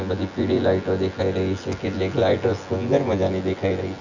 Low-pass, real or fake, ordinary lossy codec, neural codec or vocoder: 7.2 kHz; fake; none; vocoder, 24 kHz, 100 mel bands, Vocos